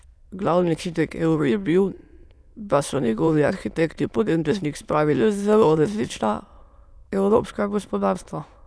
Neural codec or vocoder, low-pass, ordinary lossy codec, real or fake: autoencoder, 22.05 kHz, a latent of 192 numbers a frame, VITS, trained on many speakers; none; none; fake